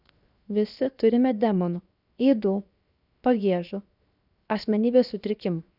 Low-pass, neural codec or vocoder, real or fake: 5.4 kHz; codec, 16 kHz, 0.7 kbps, FocalCodec; fake